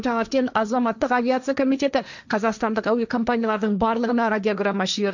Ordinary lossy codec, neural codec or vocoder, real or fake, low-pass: none; codec, 16 kHz, 1.1 kbps, Voila-Tokenizer; fake; 7.2 kHz